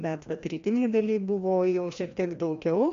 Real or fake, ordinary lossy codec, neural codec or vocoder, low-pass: fake; MP3, 64 kbps; codec, 16 kHz, 1 kbps, FreqCodec, larger model; 7.2 kHz